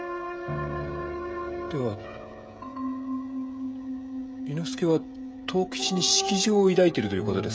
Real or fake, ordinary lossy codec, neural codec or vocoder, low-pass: fake; none; codec, 16 kHz, 16 kbps, FreqCodec, smaller model; none